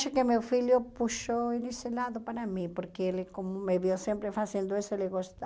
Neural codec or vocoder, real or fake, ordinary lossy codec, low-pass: none; real; none; none